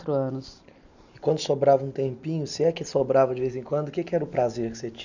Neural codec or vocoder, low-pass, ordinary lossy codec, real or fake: none; 7.2 kHz; none; real